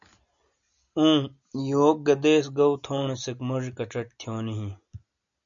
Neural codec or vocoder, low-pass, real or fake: none; 7.2 kHz; real